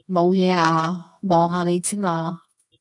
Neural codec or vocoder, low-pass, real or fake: codec, 24 kHz, 0.9 kbps, WavTokenizer, medium music audio release; 10.8 kHz; fake